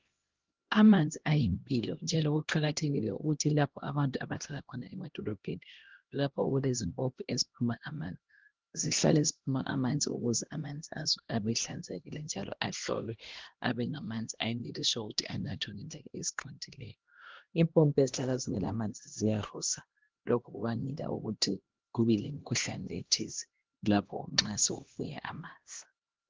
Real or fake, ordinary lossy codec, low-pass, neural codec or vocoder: fake; Opus, 16 kbps; 7.2 kHz; codec, 16 kHz, 1 kbps, X-Codec, HuBERT features, trained on LibriSpeech